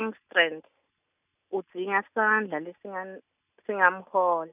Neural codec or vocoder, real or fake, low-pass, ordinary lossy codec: none; real; 3.6 kHz; none